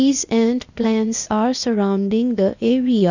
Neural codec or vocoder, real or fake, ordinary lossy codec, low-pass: codec, 16 kHz, 0.8 kbps, ZipCodec; fake; none; 7.2 kHz